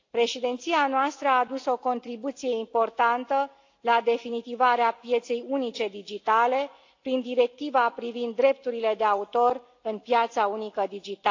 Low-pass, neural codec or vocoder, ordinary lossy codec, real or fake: 7.2 kHz; none; AAC, 48 kbps; real